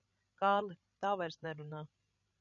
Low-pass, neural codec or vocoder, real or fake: 7.2 kHz; codec, 16 kHz, 16 kbps, FreqCodec, larger model; fake